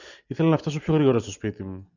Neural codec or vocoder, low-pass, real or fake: none; 7.2 kHz; real